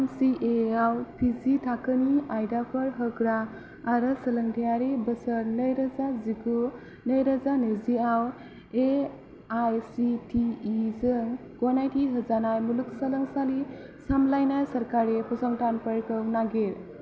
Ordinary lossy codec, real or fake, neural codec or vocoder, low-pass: none; real; none; none